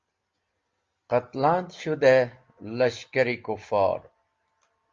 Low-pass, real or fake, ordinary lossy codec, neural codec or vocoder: 7.2 kHz; real; Opus, 32 kbps; none